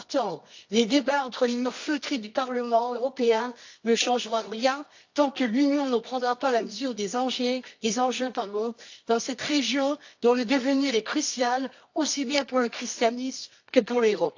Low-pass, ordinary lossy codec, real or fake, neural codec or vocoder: 7.2 kHz; AAC, 48 kbps; fake; codec, 24 kHz, 0.9 kbps, WavTokenizer, medium music audio release